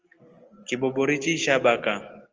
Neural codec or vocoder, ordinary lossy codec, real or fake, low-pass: none; Opus, 24 kbps; real; 7.2 kHz